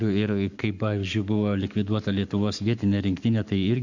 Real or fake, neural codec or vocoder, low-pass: fake; codec, 44.1 kHz, 7.8 kbps, Pupu-Codec; 7.2 kHz